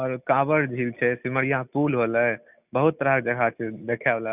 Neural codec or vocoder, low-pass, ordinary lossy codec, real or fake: none; 3.6 kHz; none; real